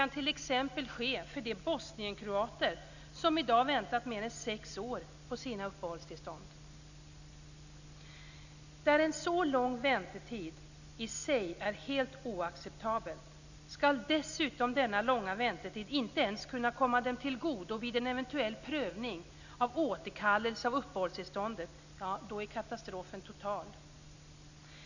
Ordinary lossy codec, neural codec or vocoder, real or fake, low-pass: none; none; real; 7.2 kHz